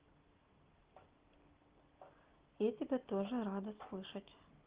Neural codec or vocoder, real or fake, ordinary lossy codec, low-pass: none; real; Opus, 16 kbps; 3.6 kHz